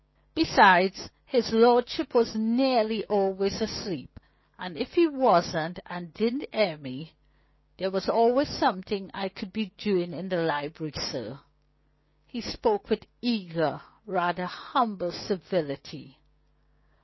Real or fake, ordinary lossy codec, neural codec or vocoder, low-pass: fake; MP3, 24 kbps; codec, 16 kHz, 6 kbps, DAC; 7.2 kHz